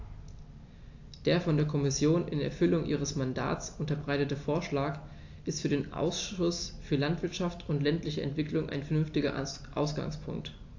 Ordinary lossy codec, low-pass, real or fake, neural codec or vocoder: AAC, 48 kbps; 7.2 kHz; real; none